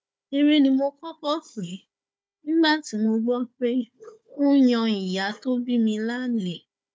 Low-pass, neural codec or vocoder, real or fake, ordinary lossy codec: none; codec, 16 kHz, 4 kbps, FunCodec, trained on Chinese and English, 50 frames a second; fake; none